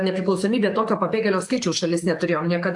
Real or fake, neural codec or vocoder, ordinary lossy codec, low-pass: fake; codec, 44.1 kHz, 7.8 kbps, DAC; AAC, 64 kbps; 10.8 kHz